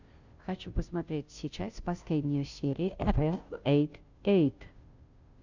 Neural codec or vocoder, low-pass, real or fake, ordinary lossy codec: codec, 16 kHz, 0.5 kbps, FunCodec, trained on Chinese and English, 25 frames a second; 7.2 kHz; fake; Opus, 64 kbps